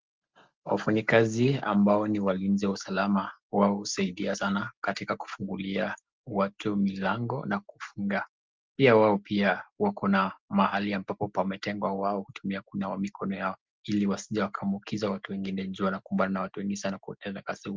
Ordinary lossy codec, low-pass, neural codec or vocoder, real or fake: Opus, 32 kbps; 7.2 kHz; none; real